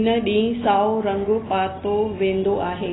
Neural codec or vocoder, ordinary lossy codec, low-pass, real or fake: none; AAC, 16 kbps; 7.2 kHz; real